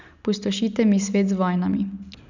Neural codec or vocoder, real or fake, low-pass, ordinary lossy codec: none; real; 7.2 kHz; none